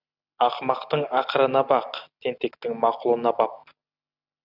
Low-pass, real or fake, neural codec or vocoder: 5.4 kHz; real; none